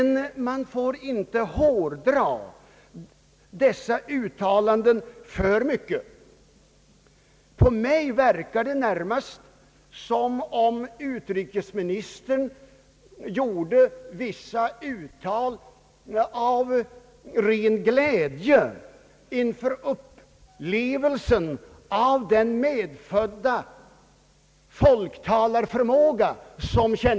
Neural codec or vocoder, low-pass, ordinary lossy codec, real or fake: none; none; none; real